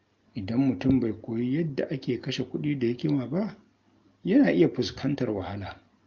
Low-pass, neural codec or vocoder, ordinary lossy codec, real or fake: 7.2 kHz; none; Opus, 24 kbps; real